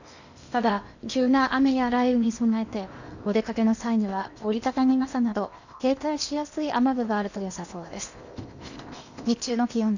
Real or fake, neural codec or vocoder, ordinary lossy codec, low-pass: fake; codec, 16 kHz in and 24 kHz out, 0.8 kbps, FocalCodec, streaming, 65536 codes; none; 7.2 kHz